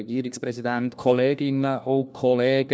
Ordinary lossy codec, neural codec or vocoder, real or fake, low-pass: none; codec, 16 kHz, 1 kbps, FunCodec, trained on LibriTTS, 50 frames a second; fake; none